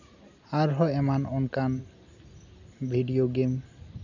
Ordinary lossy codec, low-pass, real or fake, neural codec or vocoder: none; 7.2 kHz; real; none